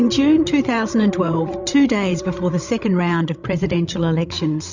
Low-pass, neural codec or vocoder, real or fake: 7.2 kHz; codec, 16 kHz, 16 kbps, FreqCodec, larger model; fake